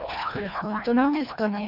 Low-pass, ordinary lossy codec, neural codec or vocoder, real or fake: 5.4 kHz; none; codec, 24 kHz, 1.5 kbps, HILCodec; fake